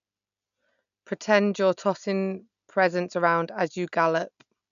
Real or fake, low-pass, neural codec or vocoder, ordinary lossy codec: real; 7.2 kHz; none; none